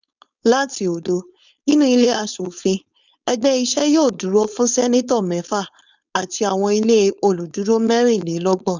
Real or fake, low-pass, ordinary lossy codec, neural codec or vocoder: fake; 7.2 kHz; none; codec, 16 kHz, 4.8 kbps, FACodec